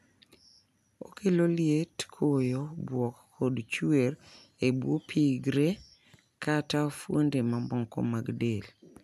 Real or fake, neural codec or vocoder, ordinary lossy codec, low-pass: real; none; none; 14.4 kHz